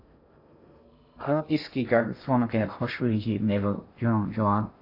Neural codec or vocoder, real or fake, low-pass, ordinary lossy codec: codec, 16 kHz in and 24 kHz out, 0.6 kbps, FocalCodec, streaming, 2048 codes; fake; 5.4 kHz; AAC, 32 kbps